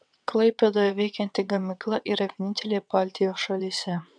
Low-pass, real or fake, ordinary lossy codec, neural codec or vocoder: 9.9 kHz; fake; MP3, 96 kbps; vocoder, 22.05 kHz, 80 mel bands, WaveNeXt